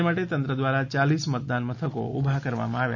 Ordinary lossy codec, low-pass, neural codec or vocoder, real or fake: none; 7.2 kHz; none; real